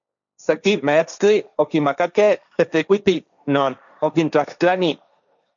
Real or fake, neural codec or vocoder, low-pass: fake; codec, 16 kHz, 1.1 kbps, Voila-Tokenizer; 7.2 kHz